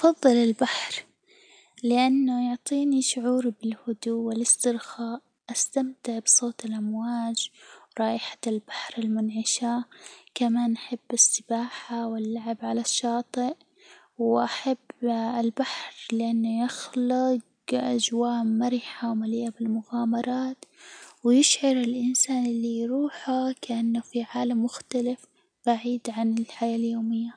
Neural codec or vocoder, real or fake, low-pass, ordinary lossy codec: none; real; 9.9 kHz; none